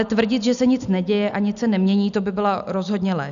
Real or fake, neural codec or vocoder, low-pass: real; none; 7.2 kHz